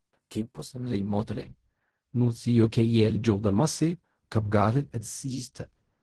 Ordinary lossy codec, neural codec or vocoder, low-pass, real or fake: Opus, 16 kbps; codec, 16 kHz in and 24 kHz out, 0.4 kbps, LongCat-Audio-Codec, fine tuned four codebook decoder; 10.8 kHz; fake